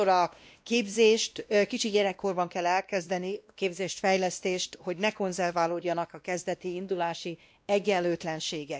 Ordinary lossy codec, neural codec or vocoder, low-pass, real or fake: none; codec, 16 kHz, 1 kbps, X-Codec, WavLM features, trained on Multilingual LibriSpeech; none; fake